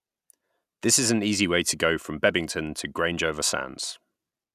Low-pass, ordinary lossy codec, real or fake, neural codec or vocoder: 14.4 kHz; none; real; none